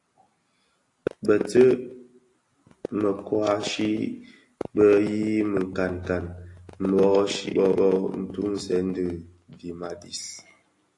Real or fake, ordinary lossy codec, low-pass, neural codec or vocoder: real; AAC, 48 kbps; 10.8 kHz; none